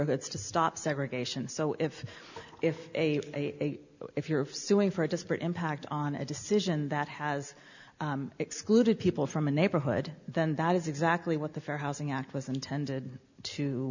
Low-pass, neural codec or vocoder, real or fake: 7.2 kHz; none; real